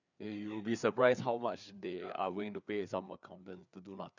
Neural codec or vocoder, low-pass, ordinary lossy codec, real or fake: codec, 16 kHz, 4 kbps, FreqCodec, larger model; 7.2 kHz; none; fake